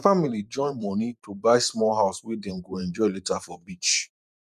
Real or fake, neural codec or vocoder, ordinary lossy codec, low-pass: fake; vocoder, 44.1 kHz, 128 mel bands every 512 samples, BigVGAN v2; none; 14.4 kHz